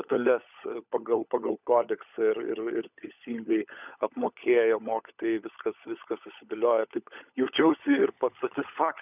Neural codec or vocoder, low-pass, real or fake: codec, 16 kHz, 16 kbps, FunCodec, trained on LibriTTS, 50 frames a second; 3.6 kHz; fake